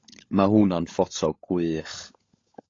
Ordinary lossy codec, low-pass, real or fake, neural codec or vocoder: AAC, 32 kbps; 7.2 kHz; fake; codec, 16 kHz, 16 kbps, FunCodec, trained on LibriTTS, 50 frames a second